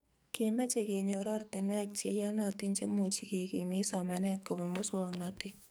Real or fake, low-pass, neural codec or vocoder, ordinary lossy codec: fake; none; codec, 44.1 kHz, 2.6 kbps, SNAC; none